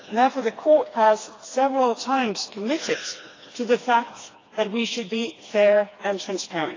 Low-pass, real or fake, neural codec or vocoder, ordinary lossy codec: 7.2 kHz; fake; codec, 16 kHz, 2 kbps, FreqCodec, smaller model; AAC, 32 kbps